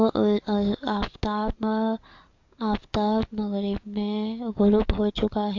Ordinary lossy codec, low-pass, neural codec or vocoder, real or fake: AAC, 48 kbps; 7.2 kHz; codec, 44.1 kHz, 7.8 kbps, DAC; fake